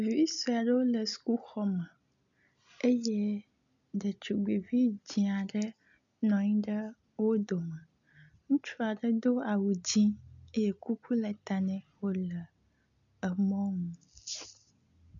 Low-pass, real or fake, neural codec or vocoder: 7.2 kHz; real; none